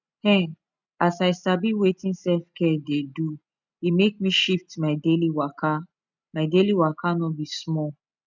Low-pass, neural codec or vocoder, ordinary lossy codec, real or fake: 7.2 kHz; none; none; real